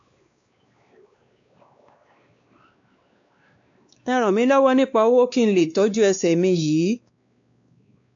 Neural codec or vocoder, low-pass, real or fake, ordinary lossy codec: codec, 16 kHz, 2 kbps, X-Codec, WavLM features, trained on Multilingual LibriSpeech; 7.2 kHz; fake; AAC, 64 kbps